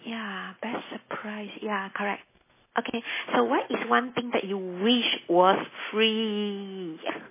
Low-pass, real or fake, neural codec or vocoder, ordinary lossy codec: 3.6 kHz; real; none; MP3, 16 kbps